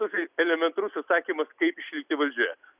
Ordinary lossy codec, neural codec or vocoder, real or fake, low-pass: Opus, 64 kbps; none; real; 3.6 kHz